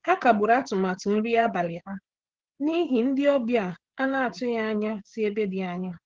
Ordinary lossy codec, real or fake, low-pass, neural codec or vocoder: Opus, 16 kbps; fake; 7.2 kHz; codec, 16 kHz, 16 kbps, FreqCodec, smaller model